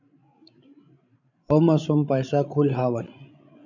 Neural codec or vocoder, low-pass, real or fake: codec, 16 kHz, 16 kbps, FreqCodec, larger model; 7.2 kHz; fake